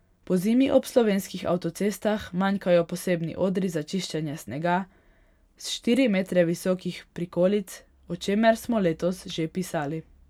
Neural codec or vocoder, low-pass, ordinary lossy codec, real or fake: none; 19.8 kHz; none; real